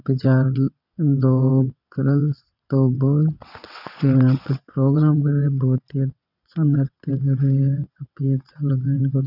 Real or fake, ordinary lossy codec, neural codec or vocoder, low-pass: fake; none; vocoder, 22.05 kHz, 80 mel bands, WaveNeXt; 5.4 kHz